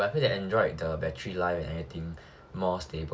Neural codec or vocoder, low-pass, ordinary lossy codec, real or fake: none; none; none; real